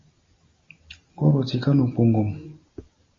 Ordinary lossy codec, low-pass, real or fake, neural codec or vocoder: MP3, 32 kbps; 7.2 kHz; real; none